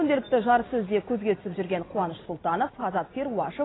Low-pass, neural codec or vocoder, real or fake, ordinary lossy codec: 7.2 kHz; none; real; AAC, 16 kbps